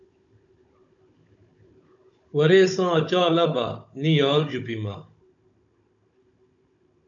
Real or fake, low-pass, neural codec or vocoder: fake; 7.2 kHz; codec, 16 kHz, 16 kbps, FunCodec, trained on Chinese and English, 50 frames a second